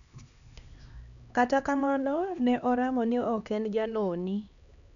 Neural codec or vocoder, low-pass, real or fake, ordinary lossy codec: codec, 16 kHz, 2 kbps, X-Codec, HuBERT features, trained on LibriSpeech; 7.2 kHz; fake; none